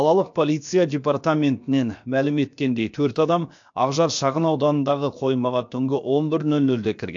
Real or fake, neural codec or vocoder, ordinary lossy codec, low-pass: fake; codec, 16 kHz, about 1 kbps, DyCAST, with the encoder's durations; AAC, 64 kbps; 7.2 kHz